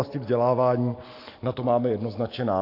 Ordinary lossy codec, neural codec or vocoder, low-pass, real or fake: AAC, 32 kbps; none; 5.4 kHz; real